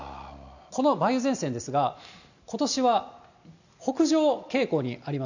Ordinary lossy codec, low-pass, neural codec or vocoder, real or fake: none; 7.2 kHz; none; real